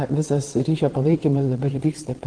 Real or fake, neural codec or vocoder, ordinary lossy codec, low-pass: fake; vocoder, 22.05 kHz, 80 mel bands, WaveNeXt; Opus, 16 kbps; 9.9 kHz